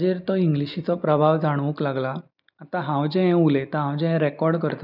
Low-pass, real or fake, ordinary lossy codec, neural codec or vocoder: 5.4 kHz; real; none; none